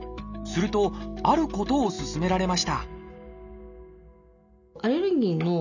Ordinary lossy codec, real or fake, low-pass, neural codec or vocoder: none; real; 7.2 kHz; none